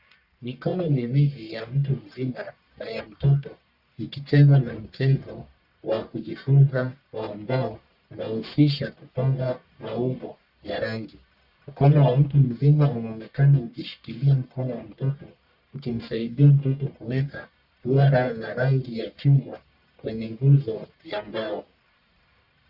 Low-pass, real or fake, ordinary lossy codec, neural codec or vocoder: 5.4 kHz; fake; Opus, 64 kbps; codec, 44.1 kHz, 1.7 kbps, Pupu-Codec